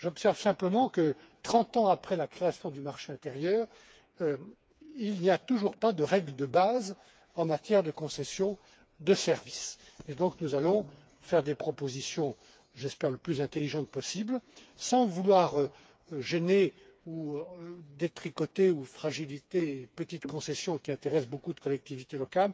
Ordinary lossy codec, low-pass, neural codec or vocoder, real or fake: none; none; codec, 16 kHz, 4 kbps, FreqCodec, smaller model; fake